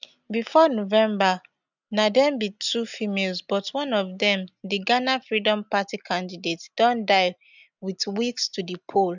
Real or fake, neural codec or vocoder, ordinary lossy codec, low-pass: real; none; none; 7.2 kHz